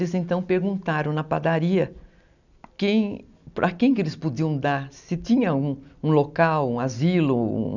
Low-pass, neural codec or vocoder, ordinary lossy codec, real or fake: 7.2 kHz; none; none; real